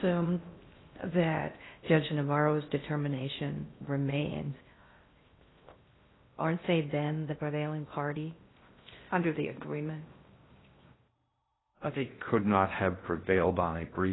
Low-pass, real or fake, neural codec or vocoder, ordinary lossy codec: 7.2 kHz; fake; codec, 16 kHz in and 24 kHz out, 0.6 kbps, FocalCodec, streaming, 2048 codes; AAC, 16 kbps